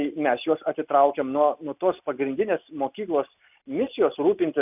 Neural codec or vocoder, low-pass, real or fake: none; 3.6 kHz; real